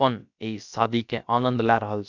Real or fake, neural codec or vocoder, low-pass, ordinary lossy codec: fake; codec, 16 kHz, about 1 kbps, DyCAST, with the encoder's durations; 7.2 kHz; none